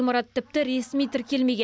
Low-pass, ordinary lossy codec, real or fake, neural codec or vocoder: none; none; real; none